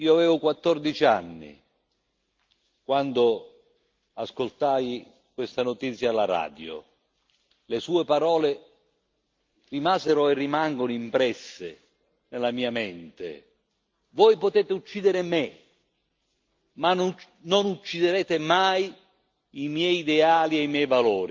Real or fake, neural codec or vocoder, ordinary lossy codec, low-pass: real; none; Opus, 24 kbps; 7.2 kHz